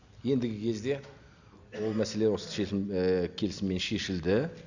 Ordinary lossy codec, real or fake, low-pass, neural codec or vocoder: Opus, 64 kbps; real; 7.2 kHz; none